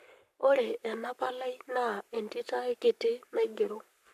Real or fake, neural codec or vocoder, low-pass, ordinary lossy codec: fake; codec, 44.1 kHz, 7.8 kbps, Pupu-Codec; 14.4 kHz; AAC, 96 kbps